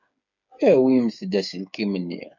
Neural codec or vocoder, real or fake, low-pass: codec, 16 kHz, 8 kbps, FreqCodec, smaller model; fake; 7.2 kHz